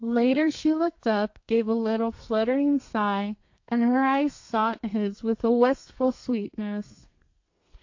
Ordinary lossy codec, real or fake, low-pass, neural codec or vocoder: AAC, 48 kbps; fake; 7.2 kHz; codec, 32 kHz, 1.9 kbps, SNAC